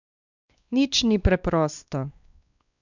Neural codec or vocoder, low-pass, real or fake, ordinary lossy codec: codec, 16 kHz, 2 kbps, X-Codec, WavLM features, trained on Multilingual LibriSpeech; 7.2 kHz; fake; none